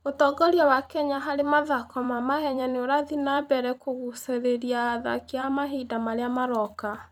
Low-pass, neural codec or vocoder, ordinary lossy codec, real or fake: 19.8 kHz; vocoder, 44.1 kHz, 128 mel bands every 256 samples, BigVGAN v2; none; fake